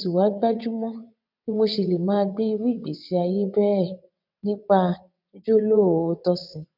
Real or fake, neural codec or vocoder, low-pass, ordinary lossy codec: fake; vocoder, 22.05 kHz, 80 mel bands, WaveNeXt; 5.4 kHz; none